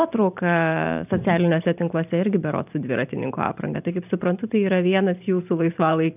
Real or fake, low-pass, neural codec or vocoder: fake; 3.6 kHz; vocoder, 44.1 kHz, 128 mel bands every 512 samples, BigVGAN v2